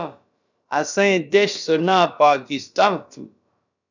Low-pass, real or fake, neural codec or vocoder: 7.2 kHz; fake; codec, 16 kHz, about 1 kbps, DyCAST, with the encoder's durations